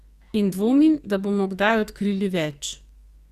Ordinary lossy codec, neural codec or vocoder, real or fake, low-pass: Opus, 64 kbps; codec, 44.1 kHz, 2.6 kbps, SNAC; fake; 14.4 kHz